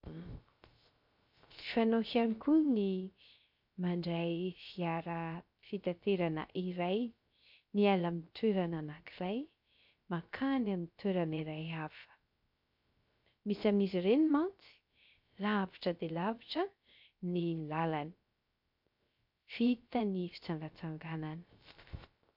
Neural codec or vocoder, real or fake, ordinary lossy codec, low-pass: codec, 16 kHz, 0.3 kbps, FocalCodec; fake; MP3, 48 kbps; 5.4 kHz